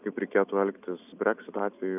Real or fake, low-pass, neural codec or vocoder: real; 3.6 kHz; none